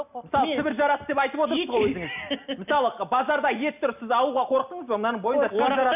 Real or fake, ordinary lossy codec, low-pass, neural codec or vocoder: real; none; 3.6 kHz; none